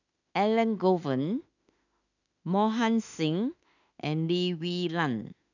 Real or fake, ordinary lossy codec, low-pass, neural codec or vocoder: fake; none; 7.2 kHz; autoencoder, 48 kHz, 32 numbers a frame, DAC-VAE, trained on Japanese speech